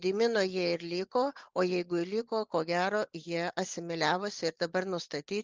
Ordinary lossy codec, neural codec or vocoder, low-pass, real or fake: Opus, 32 kbps; none; 7.2 kHz; real